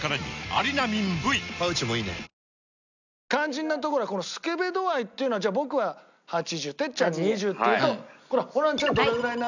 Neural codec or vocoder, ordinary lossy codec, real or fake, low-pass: none; none; real; 7.2 kHz